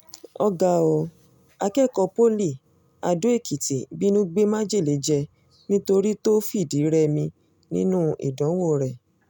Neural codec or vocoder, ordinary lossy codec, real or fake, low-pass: none; none; real; 19.8 kHz